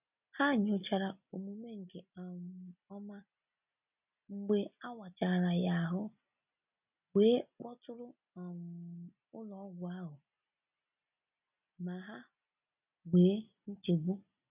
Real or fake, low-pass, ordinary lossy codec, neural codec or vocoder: real; 3.6 kHz; none; none